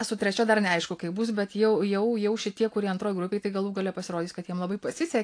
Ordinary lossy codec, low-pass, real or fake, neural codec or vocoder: AAC, 48 kbps; 9.9 kHz; real; none